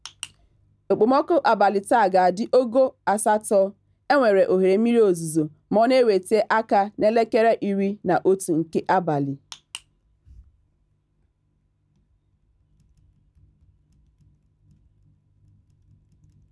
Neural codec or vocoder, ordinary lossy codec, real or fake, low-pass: none; none; real; none